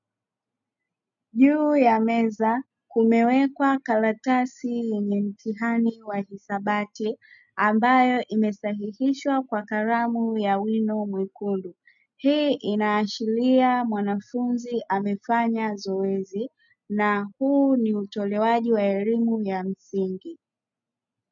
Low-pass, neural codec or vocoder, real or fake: 7.2 kHz; none; real